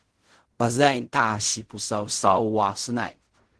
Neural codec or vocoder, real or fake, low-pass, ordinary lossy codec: codec, 16 kHz in and 24 kHz out, 0.4 kbps, LongCat-Audio-Codec, fine tuned four codebook decoder; fake; 10.8 kHz; Opus, 16 kbps